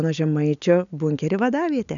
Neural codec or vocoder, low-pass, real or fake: none; 7.2 kHz; real